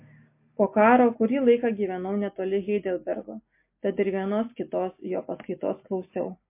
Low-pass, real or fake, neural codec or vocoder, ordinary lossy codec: 3.6 kHz; real; none; MP3, 24 kbps